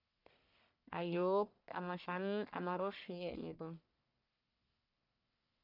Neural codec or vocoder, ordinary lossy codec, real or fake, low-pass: codec, 44.1 kHz, 1.7 kbps, Pupu-Codec; none; fake; 5.4 kHz